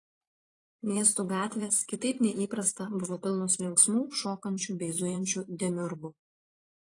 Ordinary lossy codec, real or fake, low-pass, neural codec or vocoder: AAC, 32 kbps; fake; 10.8 kHz; vocoder, 44.1 kHz, 128 mel bands every 512 samples, BigVGAN v2